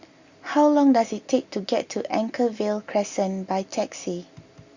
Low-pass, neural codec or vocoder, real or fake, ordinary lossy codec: 7.2 kHz; none; real; Opus, 64 kbps